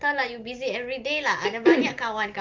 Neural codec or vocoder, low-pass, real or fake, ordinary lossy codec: none; 7.2 kHz; real; Opus, 32 kbps